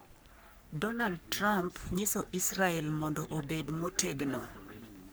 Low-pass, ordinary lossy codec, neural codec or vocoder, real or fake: none; none; codec, 44.1 kHz, 3.4 kbps, Pupu-Codec; fake